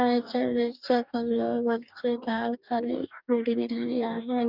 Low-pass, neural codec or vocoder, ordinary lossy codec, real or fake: 5.4 kHz; codec, 44.1 kHz, 2.6 kbps, DAC; none; fake